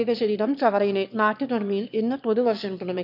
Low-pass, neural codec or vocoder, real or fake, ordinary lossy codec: 5.4 kHz; autoencoder, 22.05 kHz, a latent of 192 numbers a frame, VITS, trained on one speaker; fake; none